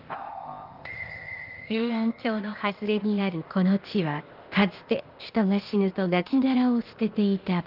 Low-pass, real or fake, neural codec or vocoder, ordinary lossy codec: 5.4 kHz; fake; codec, 16 kHz, 0.8 kbps, ZipCodec; Opus, 32 kbps